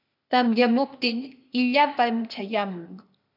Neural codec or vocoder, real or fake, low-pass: codec, 16 kHz, 0.8 kbps, ZipCodec; fake; 5.4 kHz